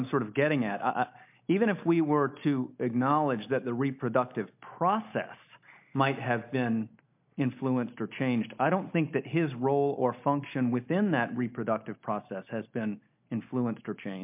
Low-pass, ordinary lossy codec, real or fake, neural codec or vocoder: 3.6 kHz; MP3, 32 kbps; real; none